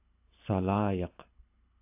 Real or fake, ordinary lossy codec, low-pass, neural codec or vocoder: fake; AAC, 32 kbps; 3.6 kHz; codec, 24 kHz, 6 kbps, HILCodec